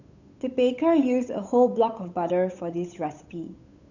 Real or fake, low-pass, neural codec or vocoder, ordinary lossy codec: fake; 7.2 kHz; codec, 16 kHz, 8 kbps, FunCodec, trained on Chinese and English, 25 frames a second; none